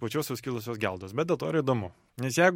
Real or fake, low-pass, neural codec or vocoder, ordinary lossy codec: real; 19.8 kHz; none; MP3, 64 kbps